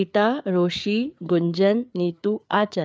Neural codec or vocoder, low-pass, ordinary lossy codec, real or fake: codec, 16 kHz, 4 kbps, FreqCodec, larger model; none; none; fake